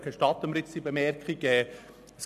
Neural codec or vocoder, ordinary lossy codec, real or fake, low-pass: none; none; real; 14.4 kHz